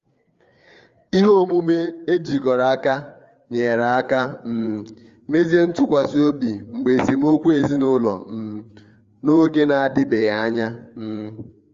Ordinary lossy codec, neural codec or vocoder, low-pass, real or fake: Opus, 32 kbps; codec, 16 kHz, 4 kbps, FreqCodec, larger model; 7.2 kHz; fake